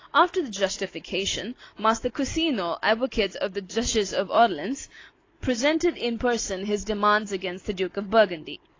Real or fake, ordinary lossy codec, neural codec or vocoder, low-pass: real; AAC, 32 kbps; none; 7.2 kHz